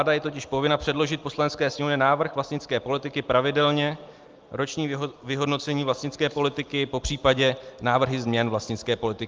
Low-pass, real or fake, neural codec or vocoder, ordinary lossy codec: 7.2 kHz; real; none; Opus, 32 kbps